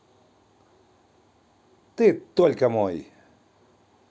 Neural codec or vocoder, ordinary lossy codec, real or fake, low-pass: none; none; real; none